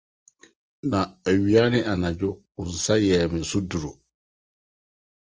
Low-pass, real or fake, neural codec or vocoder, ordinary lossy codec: 7.2 kHz; real; none; Opus, 24 kbps